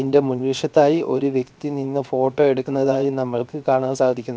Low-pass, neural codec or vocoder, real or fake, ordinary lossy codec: none; codec, 16 kHz, 0.7 kbps, FocalCodec; fake; none